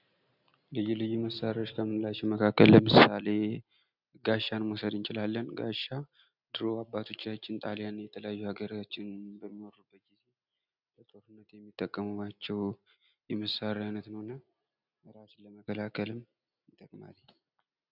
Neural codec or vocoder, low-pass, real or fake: none; 5.4 kHz; real